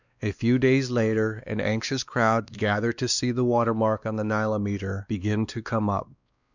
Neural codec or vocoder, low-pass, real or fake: codec, 16 kHz, 2 kbps, X-Codec, WavLM features, trained on Multilingual LibriSpeech; 7.2 kHz; fake